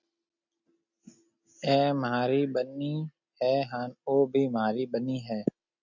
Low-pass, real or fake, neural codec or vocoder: 7.2 kHz; real; none